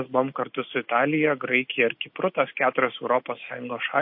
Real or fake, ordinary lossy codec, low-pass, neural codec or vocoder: real; MP3, 32 kbps; 5.4 kHz; none